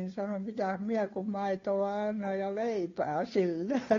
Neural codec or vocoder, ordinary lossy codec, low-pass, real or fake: codec, 16 kHz, 2 kbps, FunCodec, trained on Chinese and English, 25 frames a second; AAC, 32 kbps; 7.2 kHz; fake